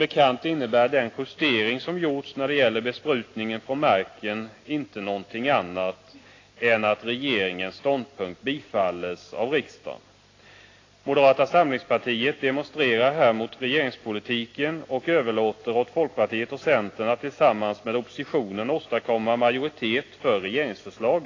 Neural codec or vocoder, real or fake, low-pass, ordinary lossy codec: none; real; 7.2 kHz; AAC, 32 kbps